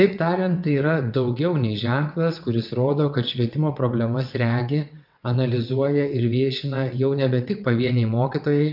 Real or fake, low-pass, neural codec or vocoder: fake; 5.4 kHz; vocoder, 22.05 kHz, 80 mel bands, WaveNeXt